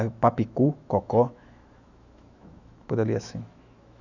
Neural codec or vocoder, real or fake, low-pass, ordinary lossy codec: autoencoder, 48 kHz, 128 numbers a frame, DAC-VAE, trained on Japanese speech; fake; 7.2 kHz; none